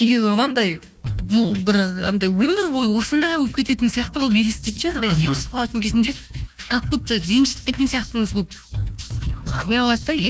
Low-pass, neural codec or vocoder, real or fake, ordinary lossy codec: none; codec, 16 kHz, 1 kbps, FunCodec, trained on Chinese and English, 50 frames a second; fake; none